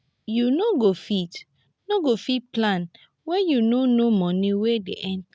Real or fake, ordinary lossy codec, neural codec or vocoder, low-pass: real; none; none; none